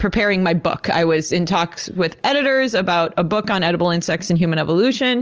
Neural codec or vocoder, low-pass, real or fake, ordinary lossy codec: none; 7.2 kHz; real; Opus, 24 kbps